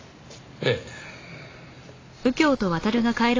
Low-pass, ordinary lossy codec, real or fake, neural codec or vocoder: 7.2 kHz; AAC, 32 kbps; real; none